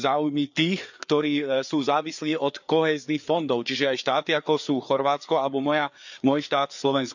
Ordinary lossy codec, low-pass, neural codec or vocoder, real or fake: none; 7.2 kHz; codec, 16 kHz, 4 kbps, FreqCodec, larger model; fake